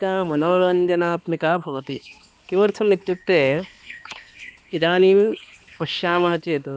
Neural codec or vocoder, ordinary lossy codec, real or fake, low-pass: codec, 16 kHz, 2 kbps, X-Codec, HuBERT features, trained on balanced general audio; none; fake; none